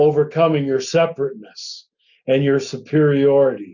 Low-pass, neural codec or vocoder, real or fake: 7.2 kHz; none; real